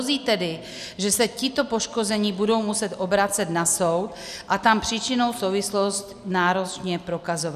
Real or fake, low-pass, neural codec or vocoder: real; 14.4 kHz; none